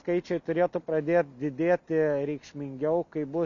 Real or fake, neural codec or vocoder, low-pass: real; none; 7.2 kHz